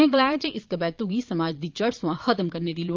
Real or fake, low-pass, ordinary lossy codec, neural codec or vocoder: fake; 7.2 kHz; Opus, 24 kbps; vocoder, 22.05 kHz, 80 mel bands, Vocos